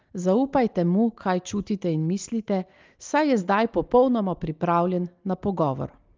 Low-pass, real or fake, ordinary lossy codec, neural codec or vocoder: 7.2 kHz; real; Opus, 32 kbps; none